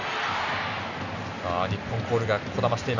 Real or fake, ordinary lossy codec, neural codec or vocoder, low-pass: real; none; none; 7.2 kHz